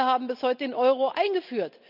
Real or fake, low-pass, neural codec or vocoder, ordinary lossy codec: real; 5.4 kHz; none; none